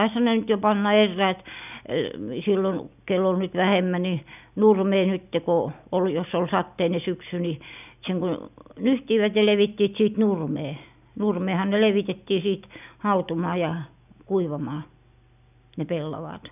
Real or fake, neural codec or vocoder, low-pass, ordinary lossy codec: real; none; 3.6 kHz; none